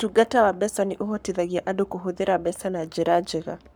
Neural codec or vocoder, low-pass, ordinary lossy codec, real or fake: codec, 44.1 kHz, 7.8 kbps, Pupu-Codec; none; none; fake